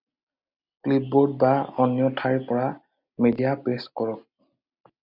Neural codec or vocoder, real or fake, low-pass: none; real; 5.4 kHz